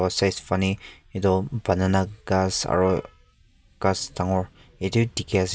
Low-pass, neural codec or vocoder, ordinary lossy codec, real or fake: none; none; none; real